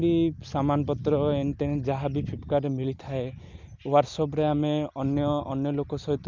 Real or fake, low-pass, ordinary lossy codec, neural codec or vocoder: real; 7.2 kHz; Opus, 24 kbps; none